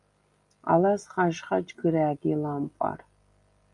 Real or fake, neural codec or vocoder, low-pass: real; none; 10.8 kHz